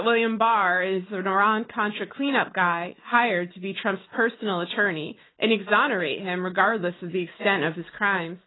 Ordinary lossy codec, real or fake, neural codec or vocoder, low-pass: AAC, 16 kbps; real; none; 7.2 kHz